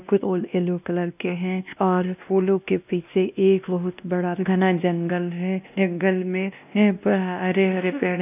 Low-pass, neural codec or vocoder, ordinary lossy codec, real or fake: 3.6 kHz; codec, 16 kHz, 1 kbps, X-Codec, WavLM features, trained on Multilingual LibriSpeech; none; fake